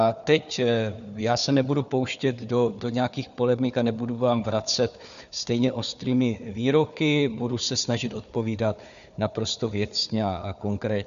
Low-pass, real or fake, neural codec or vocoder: 7.2 kHz; fake; codec, 16 kHz, 4 kbps, FunCodec, trained on Chinese and English, 50 frames a second